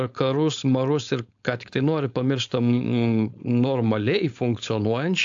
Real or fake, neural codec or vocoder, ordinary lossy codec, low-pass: fake; codec, 16 kHz, 4.8 kbps, FACodec; AAC, 64 kbps; 7.2 kHz